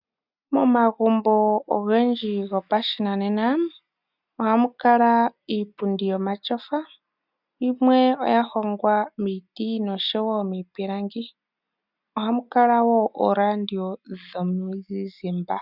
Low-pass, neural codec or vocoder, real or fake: 5.4 kHz; none; real